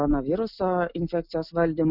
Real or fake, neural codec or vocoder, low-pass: real; none; 5.4 kHz